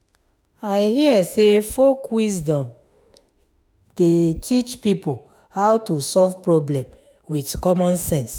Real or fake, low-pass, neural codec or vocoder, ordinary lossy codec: fake; none; autoencoder, 48 kHz, 32 numbers a frame, DAC-VAE, trained on Japanese speech; none